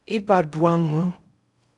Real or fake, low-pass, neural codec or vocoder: fake; 10.8 kHz; codec, 16 kHz in and 24 kHz out, 0.6 kbps, FocalCodec, streaming, 2048 codes